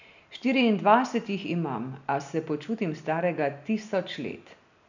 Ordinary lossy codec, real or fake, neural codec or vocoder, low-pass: none; real; none; 7.2 kHz